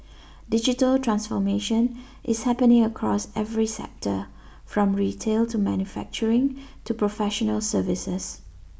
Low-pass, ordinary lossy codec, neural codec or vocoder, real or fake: none; none; none; real